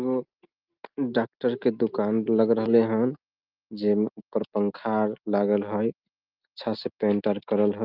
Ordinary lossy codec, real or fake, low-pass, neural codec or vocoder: Opus, 32 kbps; real; 5.4 kHz; none